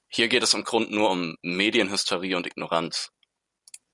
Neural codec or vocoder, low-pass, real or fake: none; 10.8 kHz; real